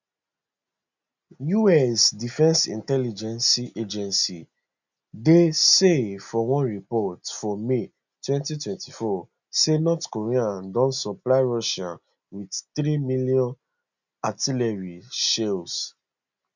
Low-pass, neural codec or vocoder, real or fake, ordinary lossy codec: 7.2 kHz; none; real; none